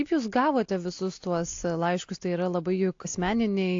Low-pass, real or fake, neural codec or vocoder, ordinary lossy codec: 7.2 kHz; real; none; AAC, 48 kbps